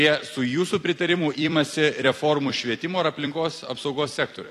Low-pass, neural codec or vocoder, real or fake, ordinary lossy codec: 14.4 kHz; vocoder, 44.1 kHz, 128 mel bands every 256 samples, BigVGAN v2; fake; AAC, 48 kbps